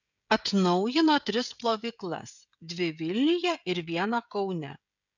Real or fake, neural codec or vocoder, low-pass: fake; codec, 16 kHz, 16 kbps, FreqCodec, smaller model; 7.2 kHz